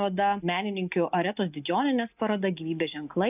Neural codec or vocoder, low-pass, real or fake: none; 3.6 kHz; real